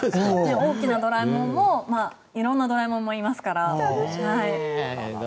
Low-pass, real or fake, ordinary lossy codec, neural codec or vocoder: none; real; none; none